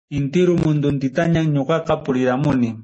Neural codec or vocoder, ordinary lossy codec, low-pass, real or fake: none; MP3, 32 kbps; 9.9 kHz; real